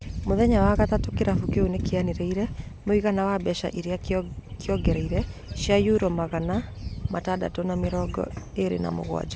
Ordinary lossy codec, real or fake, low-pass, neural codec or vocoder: none; real; none; none